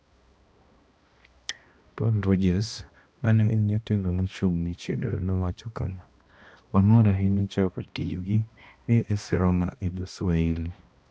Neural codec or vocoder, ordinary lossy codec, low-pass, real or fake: codec, 16 kHz, 1 kbps, X-Codec, HuBERT features, trained on balanced general audio; none; none; fake